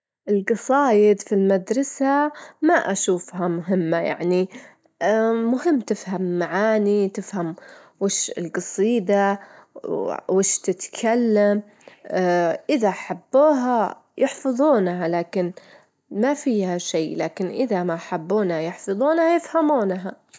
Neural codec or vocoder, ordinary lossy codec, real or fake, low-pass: none; none; real; none